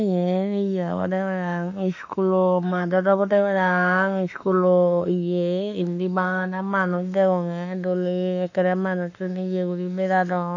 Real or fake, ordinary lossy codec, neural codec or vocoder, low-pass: fake; none; autoencoder, 48 kHz, 32 numbers a frame, DAC-VAE, trained on Japanese speech; 7.2 kHz